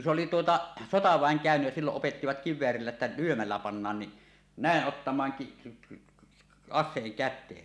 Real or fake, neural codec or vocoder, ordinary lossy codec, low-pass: real; none; none; none